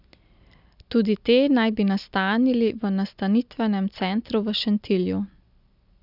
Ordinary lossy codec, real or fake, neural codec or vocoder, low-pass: none; real; none; 5.4 kHz